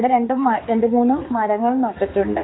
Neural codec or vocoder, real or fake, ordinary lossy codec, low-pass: codec, 16 kHz, 4 kbps, X-Codec, HuBERT features, trained on balanced general audio; fake; AAC, 16 kbps; 7.2 kHz